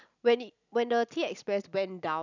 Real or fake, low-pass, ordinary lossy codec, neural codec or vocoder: real; 7.2 kHz; none; none